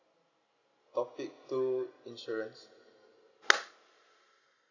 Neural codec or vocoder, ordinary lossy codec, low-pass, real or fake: none; none; 7.2 kHz; real